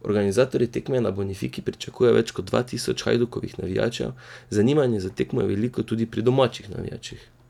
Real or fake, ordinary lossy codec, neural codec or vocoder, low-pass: real; none; none; 19.8 kHz